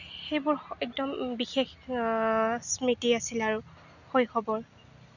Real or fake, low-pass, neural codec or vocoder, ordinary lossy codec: real; 7.2 kHz; none; none